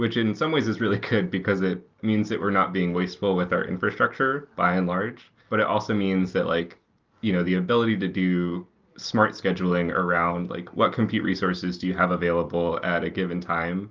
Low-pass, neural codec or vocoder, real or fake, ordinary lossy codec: 7.2 kHz; none; real; Opus, 24 kbps